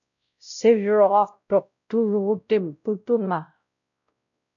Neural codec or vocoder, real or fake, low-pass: codec, 16 kHz, 0.5 kbps, X-Codec, WavLM features, trained on Multilingual LibriSpeech; fake; 7.2 kHz